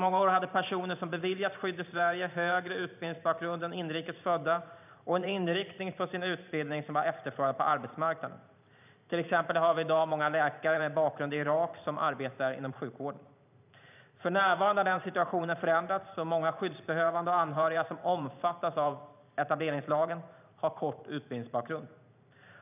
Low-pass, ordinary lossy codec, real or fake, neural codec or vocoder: 3.6 kHz; none; fake; vocoder, 44.1 kHz, 128 mel bands every 512 samples, BigVGAN v2